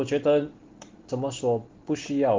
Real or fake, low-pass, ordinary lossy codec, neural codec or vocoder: real; 7.2 kHz; Opus, 16 kbps; none